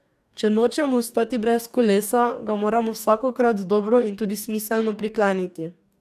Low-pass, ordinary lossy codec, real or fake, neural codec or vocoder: 14.4 kHz; none; fake; codec, 44.1 kHz, 2.6 kbps, DAC